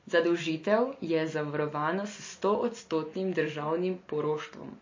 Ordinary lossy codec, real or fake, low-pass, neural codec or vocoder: MP3, 32 kbps; real; 7.2 kHz; none